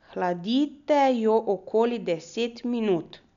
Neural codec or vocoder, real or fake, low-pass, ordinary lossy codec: none; real; 7.2 kHz; none